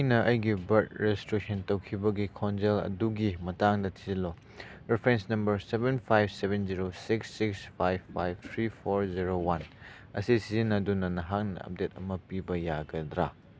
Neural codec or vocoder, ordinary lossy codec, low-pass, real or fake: none; none; none; real